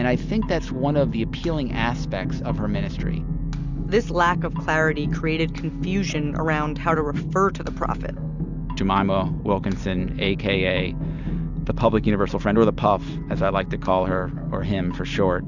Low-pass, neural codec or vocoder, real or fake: 7.2 kHz; none; real